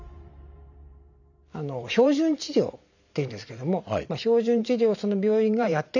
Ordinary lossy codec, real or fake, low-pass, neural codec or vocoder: none; fake; 7.2 kHz; vocoder, 44.1 kHz, 128 mel bands every 512 samples, BigVGAN v2